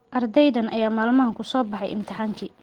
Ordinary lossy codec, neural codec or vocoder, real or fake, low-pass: Opus, 16 kbps; none; real; 19.8 kHz